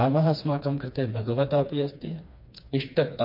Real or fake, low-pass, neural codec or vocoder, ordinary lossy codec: fake; 5.4 kHz; codec, 16 kHz, 2 kbps, FreqCodec, smaller model; MP3, 32 kbps